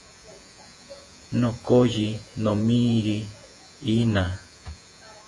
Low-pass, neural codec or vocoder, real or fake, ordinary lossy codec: 10.8 kHz; vocoder, 48 kHz, 128 mel bands, Vocos; fake; AAC, 48 kbps